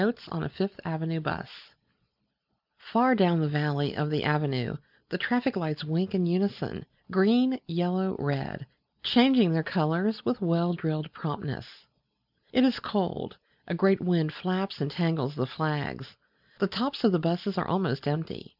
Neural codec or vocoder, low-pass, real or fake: none; 5.4 kHz; real